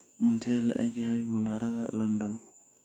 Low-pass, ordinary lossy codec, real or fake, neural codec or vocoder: 19.8 kHz; none; fake; codec, 44.1 kHz, 2.6 kbps, DAC